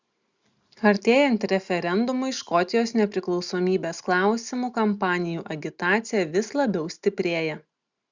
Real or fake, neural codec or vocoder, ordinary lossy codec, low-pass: real; none; Opus, 64 kbps; 7.2 kHz